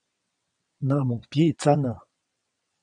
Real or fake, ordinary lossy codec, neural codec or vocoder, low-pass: fake; MP3, 96 kbps; vocoder, 22.05 kHz, 80 mel bands, WaveNeXt; 9.9 kHz